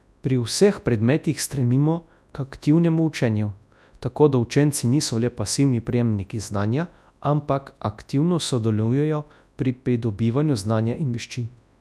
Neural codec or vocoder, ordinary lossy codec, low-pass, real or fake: codec, 24 kHz, 0.9 kbps, WavTokenizer, large speech release; none; none; fake